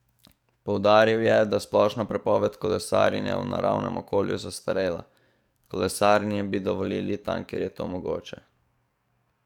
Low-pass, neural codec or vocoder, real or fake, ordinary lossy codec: 19.8 kHz; codec, 44.1 kHz, 7.8 kbps, DAC; fake; none